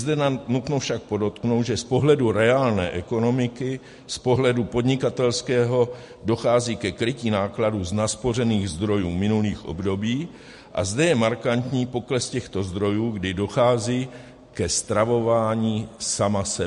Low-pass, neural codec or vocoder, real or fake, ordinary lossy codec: 14.4 kHz; none; real; MP3, 48 kbps